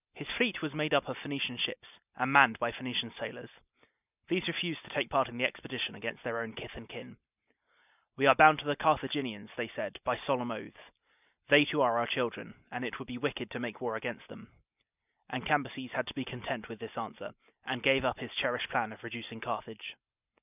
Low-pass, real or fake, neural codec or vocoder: 3.6 kHz; real; none